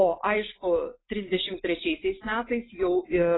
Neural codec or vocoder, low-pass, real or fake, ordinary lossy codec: none; 7.2 kHz; real; AAC, 16 kbps